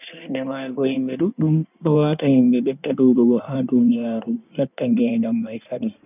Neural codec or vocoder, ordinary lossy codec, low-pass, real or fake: codec, 16 kHz in and 24 kHz out, 1.1 kbps, FireRedTTS-2 codec; none; 3.6 kHz; fake